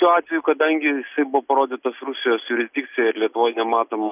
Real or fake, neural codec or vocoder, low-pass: real; none; 3.6 kHz